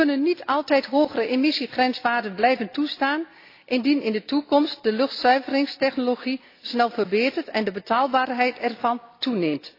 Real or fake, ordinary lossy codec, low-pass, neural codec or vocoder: real; AAC, 32 kbps; 5.4 kHz; none